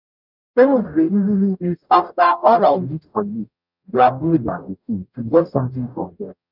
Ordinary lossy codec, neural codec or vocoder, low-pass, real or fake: none; codec, 44.1 kHz, 0.9 kbps, DAC; 5.4 kHz; fake